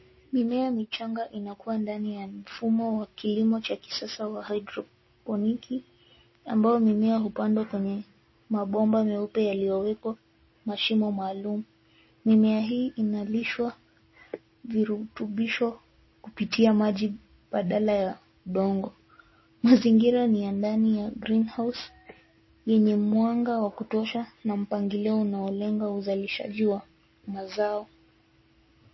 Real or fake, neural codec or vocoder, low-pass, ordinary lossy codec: real; none; 7.2 kHz; MP3, 24 kbps